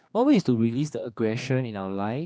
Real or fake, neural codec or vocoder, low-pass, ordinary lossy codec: fake; codec, 16 kHz, 2 kbps, X-Codec, HuBERT features, trained on general audio; none; none